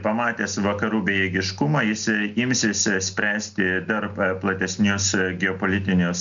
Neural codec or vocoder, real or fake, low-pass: none; real; 7.2 kHz